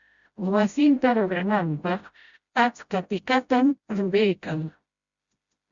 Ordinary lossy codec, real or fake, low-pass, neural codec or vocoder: Opus, 64 kbps; fake; 7.2 kHz; codec, 16 kHz, 0.5 kbps, FreqCodec, smaller model